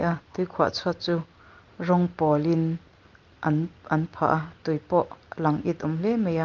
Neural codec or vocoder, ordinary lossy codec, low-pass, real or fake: none; Opus, 32 kbps; 7.2 kHz; real